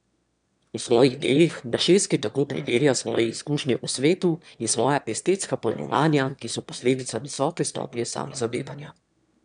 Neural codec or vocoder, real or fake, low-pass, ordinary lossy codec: autoencoder, 22.05 kHz, a latent of 192 numbers a frame, VITS, trained on one speaker; fake; 9.9 kHz; none